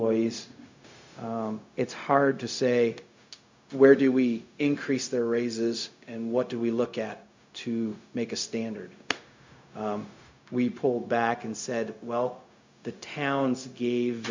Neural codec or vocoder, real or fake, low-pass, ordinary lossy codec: codec, 16 kHz, 0.4 kbps, LongCat-Audio-Codec; fake; 7.2 kHz; MP3, 64 kbps